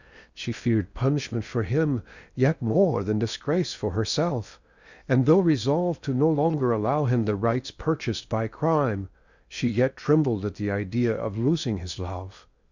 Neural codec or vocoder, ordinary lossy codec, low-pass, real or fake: codec, 16 kHz in and 24 kHz out, 0.8 kbps, FocalCodec, streaming, 65536 codes; Opus, 64 kbps; 7.2 kHz; fake